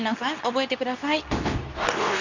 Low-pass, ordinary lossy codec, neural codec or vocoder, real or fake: 7.2 kHz; none; codec, 24 kHz, 0.9 kbps, WavTokenizer, medium speech release version 2; fake